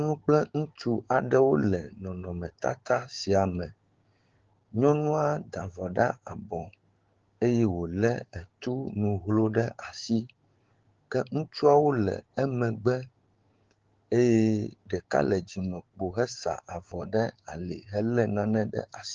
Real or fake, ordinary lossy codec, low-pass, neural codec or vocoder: fake; Opus, 16 kbps; 7.2 kHz; codec, 16 kHz, 6 kbps, DAC